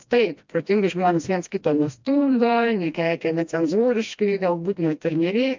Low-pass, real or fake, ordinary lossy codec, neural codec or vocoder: 7.2 kHz; fake; MP3, 64 kbps; codec, 16 kHz, 1 kbps, FreqCodec, smaller model